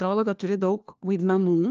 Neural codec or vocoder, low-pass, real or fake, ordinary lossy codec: codec, 16 kHz, 1 kbps, FunCodec, trained on LibriTTS, 50 frames a second; 7.2 kHz; fake; Opus, 24 kbps